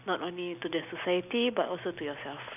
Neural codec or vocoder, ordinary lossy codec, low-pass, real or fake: none; none; 3.6 kHz; real